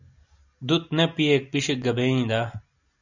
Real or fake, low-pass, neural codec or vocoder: real; 7.2 kHz; none